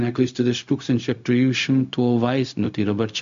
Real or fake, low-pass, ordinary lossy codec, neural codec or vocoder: fake; 7.2 kHz; MP3, 64 kbps; codec, 16 kHz, 0.4 kbps, LongCat-Audio-Codec